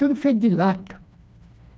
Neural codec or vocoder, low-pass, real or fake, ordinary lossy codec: codec, 16 kHz, 2 kbps, FreqCodec, smaller model; none; fake; none